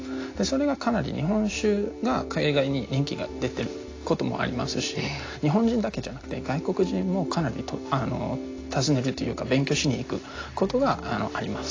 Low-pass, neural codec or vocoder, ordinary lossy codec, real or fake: 7.2 kHz; none; AAC, 32 kbps; real